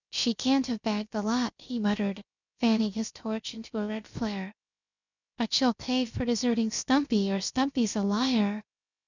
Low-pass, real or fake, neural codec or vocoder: 7.2 kHz; fake; codec, 16 kHz, 0.7 kbps, FocalCodec